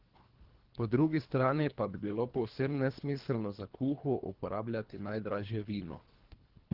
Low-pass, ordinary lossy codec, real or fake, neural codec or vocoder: 5.4 kHz; Opus, 16 kbps; fake; codec, 24 kHz, 3 kbps, HILCodec